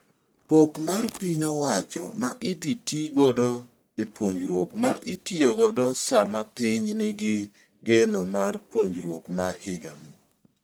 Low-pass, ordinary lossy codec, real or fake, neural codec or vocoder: none; none; fake; codec, 44.1 kHz, 1.7 kbps, Pupu-Codec